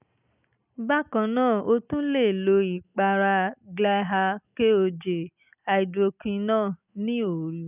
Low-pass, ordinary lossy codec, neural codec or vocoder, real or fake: 3.6 kHz; none; none; real